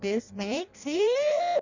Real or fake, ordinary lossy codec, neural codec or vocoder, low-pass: fake; none; codec, 16 kHz in and 24 kHz out, 0.6 kbps, FireRedTTS-2 codec; 7.2 kHz